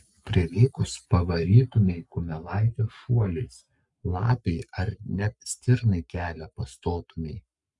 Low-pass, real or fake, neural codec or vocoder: 10.8 kHz; fake; codec, 44.1 kHz, 7.8 kbps, Pupu-Codec